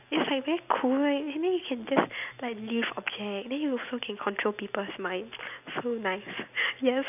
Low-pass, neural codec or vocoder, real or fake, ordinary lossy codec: 3.6 kHz; none; real; none